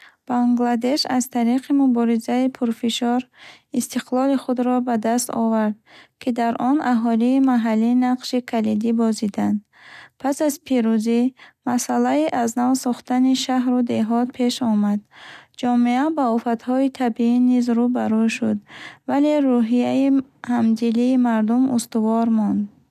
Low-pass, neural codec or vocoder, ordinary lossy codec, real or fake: 14.4 kHz; none; none; real